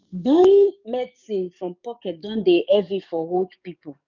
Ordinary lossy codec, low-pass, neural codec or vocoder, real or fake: none; 7.2 kHz; codec, 44.1 kHz, 7.8 kbps, DAC; fake